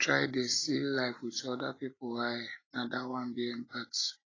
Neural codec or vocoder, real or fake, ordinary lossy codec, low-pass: none; real; AAC, 32 kbps; 7.2 kHz